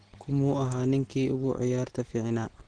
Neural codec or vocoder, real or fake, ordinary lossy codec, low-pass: none; real; Opus, 16 kbps; 9.9 kHz